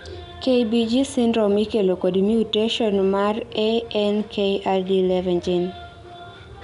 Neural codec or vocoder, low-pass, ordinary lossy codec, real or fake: none; 10.8 kHz; none; real